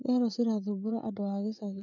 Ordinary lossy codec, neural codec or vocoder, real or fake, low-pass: none; codec, 16 kHz, 16 kbps, FreqCodec, smaller model; fake; 7.2 kHz